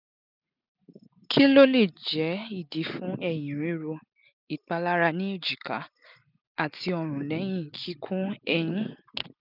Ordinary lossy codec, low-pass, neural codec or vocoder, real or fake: none; 5.4 kHz; none; real